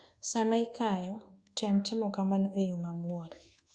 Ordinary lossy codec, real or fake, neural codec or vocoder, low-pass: Opus, 64 kbps; fake; codec, 24 kHz, 1.2 kbps, DualCodec; 9.9 kHz